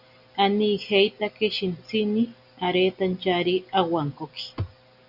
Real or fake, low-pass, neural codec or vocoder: real; 5.4 kHz; none